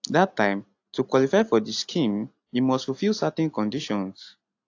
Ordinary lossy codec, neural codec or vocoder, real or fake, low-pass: AAC, 48 kbps; none; real; 7.2 kHz